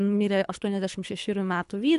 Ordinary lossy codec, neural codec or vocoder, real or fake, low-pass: MP3, 96 kbps; codec, 24 kHz, 3 kbps, HILCodec; fake; 10.8 kHz